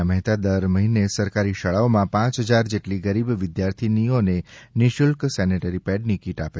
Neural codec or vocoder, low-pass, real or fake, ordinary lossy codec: none; none; real; none